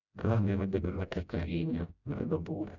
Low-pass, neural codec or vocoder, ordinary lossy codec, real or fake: 7.2 kHz; codec, 16 kHz, 0.5 kbps, FreqCodec, smaller model; none; fake